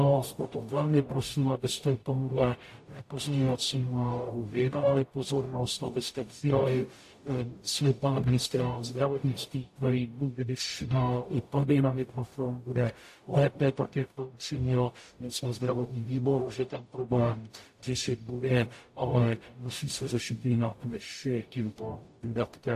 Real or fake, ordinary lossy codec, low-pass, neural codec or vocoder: fake; AAC, 64 kbps; 14.4 kHz; codec, 44.1 kHz, 0.9 kbps, DAC